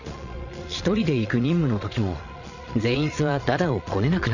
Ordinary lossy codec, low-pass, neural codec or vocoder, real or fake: none; 7.2 kHz; vocoder, 22.05 kHz, 80 mel bands, Vocos; fake